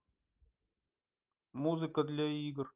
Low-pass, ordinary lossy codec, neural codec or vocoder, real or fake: 3.6 kHz; Opus, 32 kbps; none; real